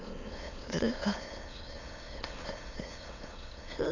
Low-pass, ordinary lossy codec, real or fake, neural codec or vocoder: 7.2 kHz; none; fake; autoencoder, 22.05 kHz, a latent of 192 numbers a frame, VITS, trained on many speakers